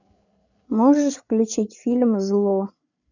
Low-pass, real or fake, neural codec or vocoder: 7.2 kHz; fake; codec, 16 kHz, 8 kbps, FreqCodec, larger model